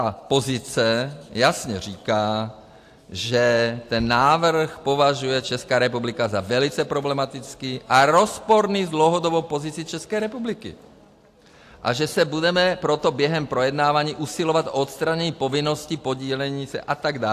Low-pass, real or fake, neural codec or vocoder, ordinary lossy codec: 14.4 kHz; real; none; AAC, 64 kbps